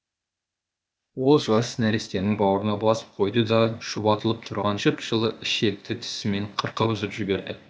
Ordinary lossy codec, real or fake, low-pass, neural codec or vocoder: none; fake; none; codec, 16 kHz, 0.8 kbps, ZipCodec